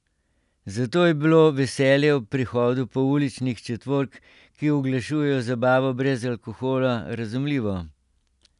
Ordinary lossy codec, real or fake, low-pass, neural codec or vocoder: none; real; 10.8 kHz; none